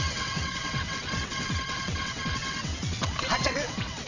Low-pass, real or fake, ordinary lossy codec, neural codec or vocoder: 7.2 kHz; fake; none; vocoder, 22.05 kHz, 80 mel bands, WaveNeXt